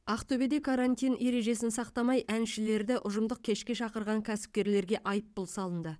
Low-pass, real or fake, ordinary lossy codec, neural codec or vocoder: none; fake; none; vocoder, 22.05 kHz, 80 mel bands, WaveNeXt